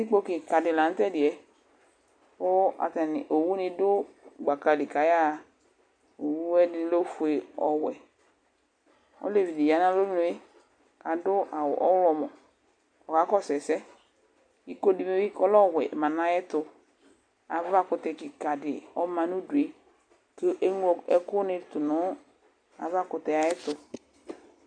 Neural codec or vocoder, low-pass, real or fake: none; 9.9 kHz; real